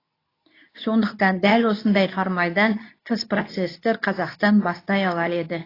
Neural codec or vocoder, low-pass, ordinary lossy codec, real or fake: codec, 24 kHz, 0.9 kbps, WavTokenizer, medium speech release version 2; 5.4 kHz; AAC, 24 kbps; fake